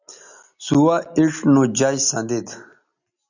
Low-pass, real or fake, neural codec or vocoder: 7.2 kHz; real; none